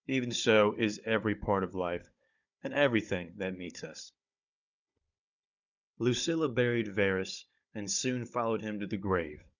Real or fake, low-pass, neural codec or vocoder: fake; 7.2 kHz; codec, 16 kHz, 16 kbps, FunCodec, trained on Chinese and English, 50 frames a second